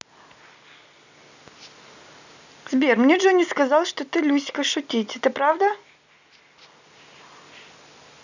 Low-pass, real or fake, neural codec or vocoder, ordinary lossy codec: 7.2 kHz; real; none; none